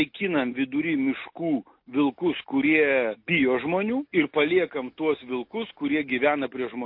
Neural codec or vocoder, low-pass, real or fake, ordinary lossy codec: none; 5.4 kHz; real; MP3, 32 kbps